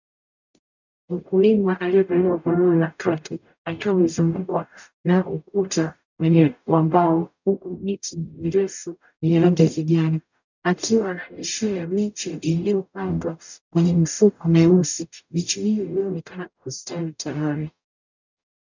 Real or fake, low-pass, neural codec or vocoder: fake; 7.2 kHz; codec, 44.1 kHz, 0.9 kbps, DAC